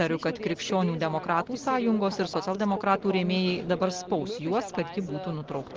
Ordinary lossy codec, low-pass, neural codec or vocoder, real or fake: Opus, 16 kbps; 7.2 kHz; none; real